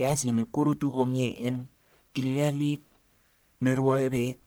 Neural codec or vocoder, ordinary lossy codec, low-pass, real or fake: codec, 44.1 kHz, 1.7 kbps, Pupu-Codec; none; none; fake